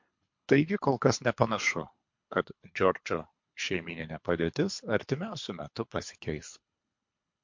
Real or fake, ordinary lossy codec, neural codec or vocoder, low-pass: fake; MP3, 48 kbps; codec, 24 kHz, 3 kbps, HILCodec; 7.2 kHz